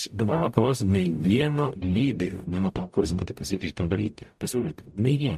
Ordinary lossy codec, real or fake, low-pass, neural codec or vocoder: MP3, 64 kbps; fake; 14.4 kHz; codec, 44.1 kHz, 0.9 kbps, DAC